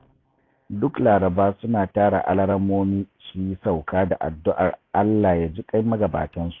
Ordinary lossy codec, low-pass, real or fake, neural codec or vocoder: AAC, 32 kbps; 7.2 kHz; real; none